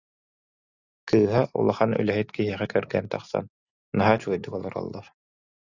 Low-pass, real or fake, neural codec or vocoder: 7.2 kHz; real; none